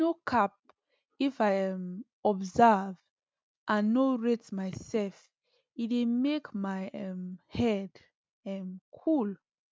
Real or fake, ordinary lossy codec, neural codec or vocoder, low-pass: real; none; none; none